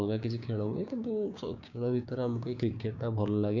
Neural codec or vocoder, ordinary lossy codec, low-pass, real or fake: codec, 16 kHz, 6 kbps, DAC; none; 7.2 kHz; fake